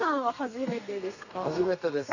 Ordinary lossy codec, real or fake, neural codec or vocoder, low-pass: none; fake; codec, 32 kHz, 1.9 kbps, SNAC; 7.2 kHz